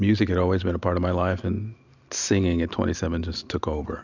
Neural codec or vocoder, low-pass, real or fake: none; 7.2 kHz; real